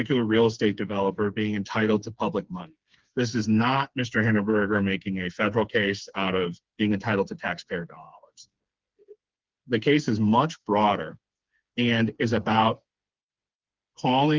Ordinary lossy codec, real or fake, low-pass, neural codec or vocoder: Opus, 32 kbps; fake; 7.2 kHz; codec, 16 kHz, 4 kbps, FreqCodec, smaller model